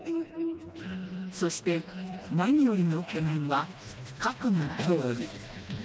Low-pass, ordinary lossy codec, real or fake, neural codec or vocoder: none; none; fake; codec, 16 kHz, 1 kbps, FreqCodec, smaller model